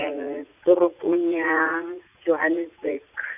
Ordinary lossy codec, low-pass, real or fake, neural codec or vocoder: none; 3.6 kHz; fake; vocoder, 44.1 kHz, 80 mel bands, Vocos